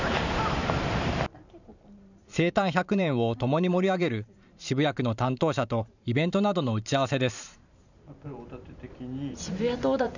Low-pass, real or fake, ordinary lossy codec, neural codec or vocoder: 7.2 kHz; fake; none; vocoder, 44.1 kHz, 128 mel bands every 512 samples, BigVGAN v2